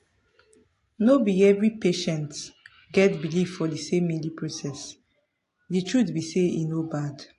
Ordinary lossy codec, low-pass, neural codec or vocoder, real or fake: AAC, 48 kbps; 10.8 kHz; none; real